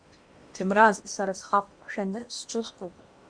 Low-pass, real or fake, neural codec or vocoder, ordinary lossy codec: 9.9 kHz; fake; codec, 16 kHz in and 24 kHz out, 0.8 kbps, FocalCodec, streaming, 65536 codes; Opus, 64 kbps